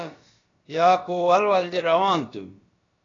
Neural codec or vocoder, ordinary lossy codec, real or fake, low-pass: codec, 16 kHz, about 1 kbps, DyCAST, with the encoder's durations; AAC, 32 kbps; fake; 7.2 kHz